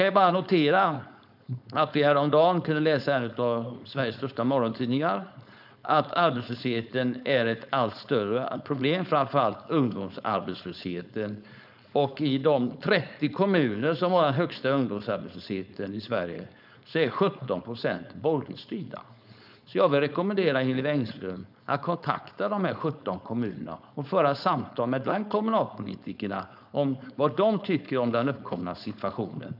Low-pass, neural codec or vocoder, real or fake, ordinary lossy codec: 5.4 kHz; codec, 16 kHz, 4.8 kbps, FACodec; fake; none